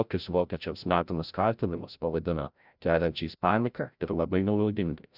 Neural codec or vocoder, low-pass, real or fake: codec, 16 kHz, 0.5 kbps, FreqCodec, larger model; 5.4 kHz; fake